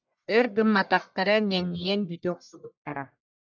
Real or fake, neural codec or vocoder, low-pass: fake; codec, 44.1 kHz, 1.7 kbps, Pupu-Codec; 7.2 kHz